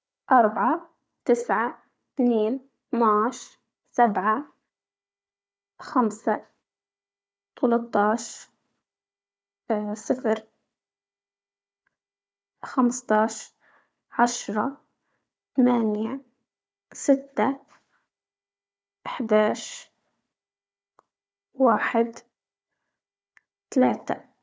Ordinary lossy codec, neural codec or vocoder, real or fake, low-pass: none; codec, 16 kHz, 4 kbps, FunCodec, trained on Chinese and English, 50 frames a second; fake; none